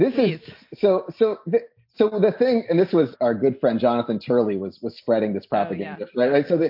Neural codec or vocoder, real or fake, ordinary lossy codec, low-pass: none; real; MP3, 32 kbps; 5.4 kHz